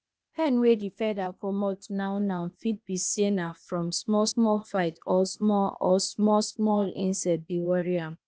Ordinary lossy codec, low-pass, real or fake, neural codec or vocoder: none; none; fake; codec, 16 kHz, 0.8 kbps, ZipCodec